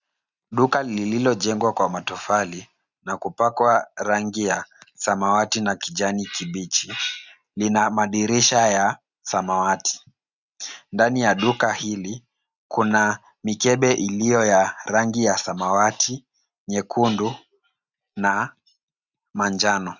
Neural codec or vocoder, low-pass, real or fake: none; 7.2 kHz; real